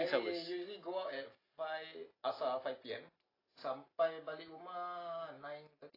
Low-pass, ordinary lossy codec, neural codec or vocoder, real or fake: 5.4 kHz; AAC, 24 kbps; none; real